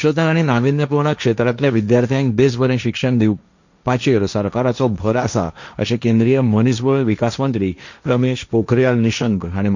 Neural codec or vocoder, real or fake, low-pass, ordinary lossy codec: codec, 16 kHz, 1.1 kbps, Voila-Tokenizer; fake; 7.2 kHz; none